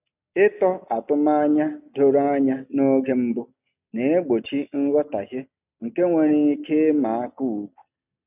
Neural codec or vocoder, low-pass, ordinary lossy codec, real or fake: none; 3.6 kHz; none; real